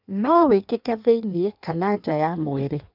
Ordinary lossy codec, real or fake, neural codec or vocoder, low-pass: none; fake; codec, 16 kHz in and 24 kHz out, 1.1 kbps, FireRedTTS-2 codec; 5.4 kHz